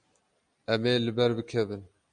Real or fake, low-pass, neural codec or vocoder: real; 9.9 kHz; none